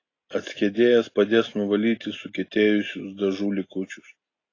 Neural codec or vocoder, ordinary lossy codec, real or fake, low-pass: none; AAC, 32 kbps; real; 7.2 kHz